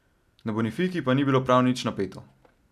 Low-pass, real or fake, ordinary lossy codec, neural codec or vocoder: 14.4 kHz; real; none; none